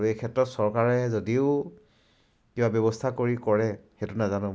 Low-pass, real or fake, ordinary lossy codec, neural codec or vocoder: none; real; none; none